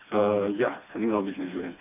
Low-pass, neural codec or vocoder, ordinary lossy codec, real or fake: 3.6 kHz; codec, 16 kHz, 2 kbps, FreqCodec, smaller model; none; fake